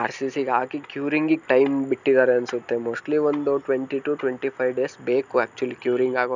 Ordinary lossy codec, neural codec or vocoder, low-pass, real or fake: none; none; 7.2 kHz; real